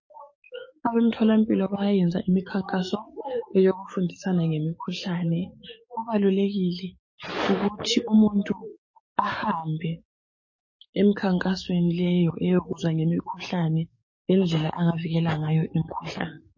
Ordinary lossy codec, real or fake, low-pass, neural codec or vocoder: MP3, 32 kbps; fake; 7.2 kHz; codec, 44.1 kHz, 7.8 kbps, DAC